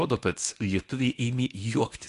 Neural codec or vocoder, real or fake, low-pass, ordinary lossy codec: codec, 24 kHz, 0.9 kbps, WavTokenizer, medium speech release version 1; fake; 10.8 kHz; AAC, 48 kbps